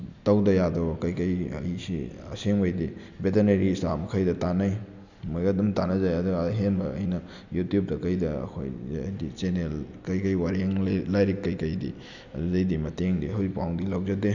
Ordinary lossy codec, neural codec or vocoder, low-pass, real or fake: none; none; 7.2 kHz; real